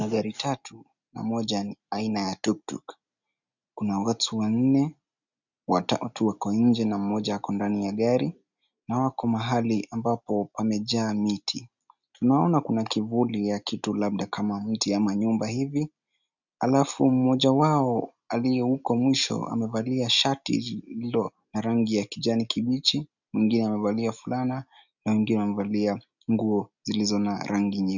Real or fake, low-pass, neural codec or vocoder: real; 7.2 kHz; none